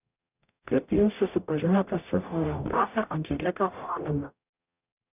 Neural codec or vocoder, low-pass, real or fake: codec, 44.1 kHz, 0.9 kbps, DAC; 3.6 kHz; fake